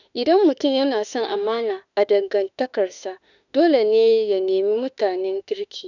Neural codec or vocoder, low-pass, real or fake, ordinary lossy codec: autoencoder, 48 kHz, 32 numbers a frame, DAC-VAE, trained on Japanese speech; 7.2 kHz; fake; none